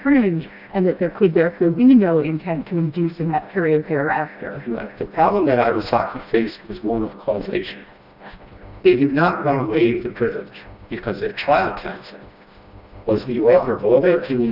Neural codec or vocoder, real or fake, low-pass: codec, 16 kHz, 1 kbps, FreqCodec, smaller model; fake; 5.4 kHz